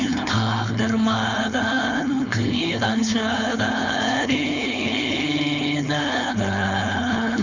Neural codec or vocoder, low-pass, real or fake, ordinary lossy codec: codec, 16 kHz, 4.8 kbps, FACodec; 7.2 kHz; fake; none